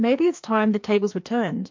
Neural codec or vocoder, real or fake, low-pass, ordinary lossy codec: codec, 16 kHz, 2 kbps, FreqCodec, larger model; fake; 7.2 kHz; MP3, 48 kbps